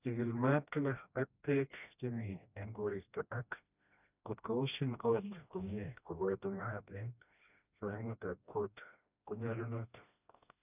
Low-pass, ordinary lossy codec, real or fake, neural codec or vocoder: 3.6 kHz; none; fake; codec, 16 kHz, 1 kbps, FreqCodec, smaller model